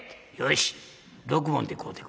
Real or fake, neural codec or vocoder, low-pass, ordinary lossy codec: real; none; none; none